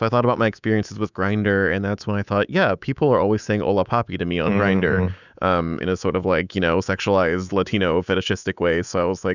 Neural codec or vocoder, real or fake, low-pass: codec, 24 kHz, 3.1 kbps, DualCodec; fake; 7.2 kHz